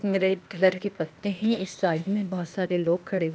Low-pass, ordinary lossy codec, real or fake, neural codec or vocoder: none; none; fake; codec, 16 kHz, 0.8 kbps, ZipCodec